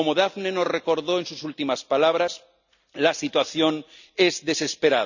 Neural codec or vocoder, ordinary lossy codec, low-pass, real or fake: none; none; 7.2 kHz; real